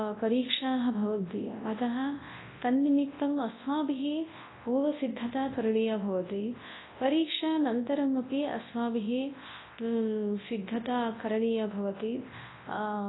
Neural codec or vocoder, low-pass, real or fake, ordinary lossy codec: codec, 24 kHz, 0.9 kbps, WavTokenizer, large speech release; 7.2 kHz; fake; AAC, 16 kbps